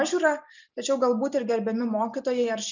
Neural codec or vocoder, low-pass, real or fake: none; 7.2 kHz; real